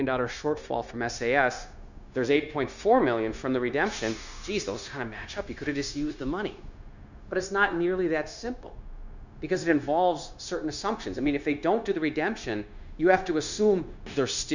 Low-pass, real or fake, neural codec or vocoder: 7.2 kHz; fake; codec, 16 kHz, 0.9 kbps, LongCat-Audio-Codec